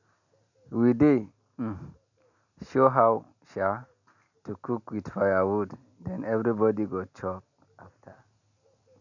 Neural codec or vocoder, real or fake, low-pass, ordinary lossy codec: none; real; 7.2 kHz; none